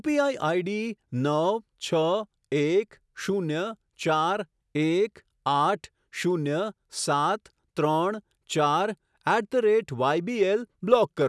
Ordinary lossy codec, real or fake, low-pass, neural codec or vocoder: none; real; none; none